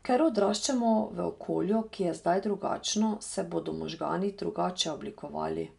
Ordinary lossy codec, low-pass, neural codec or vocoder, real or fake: none; 10.8 kHz; none; real